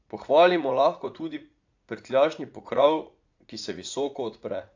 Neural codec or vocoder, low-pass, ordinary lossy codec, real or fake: vocoder, 44.1 kHz, 80 mel bands, Vocos; 7.2 kHz; AAC, 48 kbps; fake